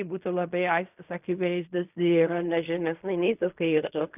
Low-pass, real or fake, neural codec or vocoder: 3.6 kHz; fake; codec, 16 kHz in and 24 kHz out, 0.4 kbps, LongCat-Audio-Codec, fine tuned four codebook decoder